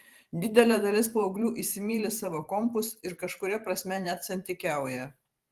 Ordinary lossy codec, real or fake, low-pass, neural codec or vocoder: Opus, 24 kbps; fake; 14.4 kHz; vocoder, 44.1 kHz, 128 mel bands every 512 samples, BigVGAN v2